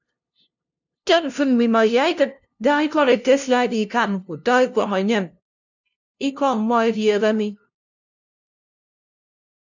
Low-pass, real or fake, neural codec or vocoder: 7.2 kHz; fake; codec, 16 kHz, 0.5 kbps, FunCodec, trained on LibriTTS, 25 frames a second